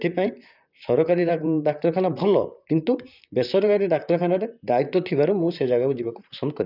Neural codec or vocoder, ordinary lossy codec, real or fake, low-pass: vocoder, 44.1 kHz, 128 mel bands, Pupu-Vocoder; none; fake; 5.4 kHz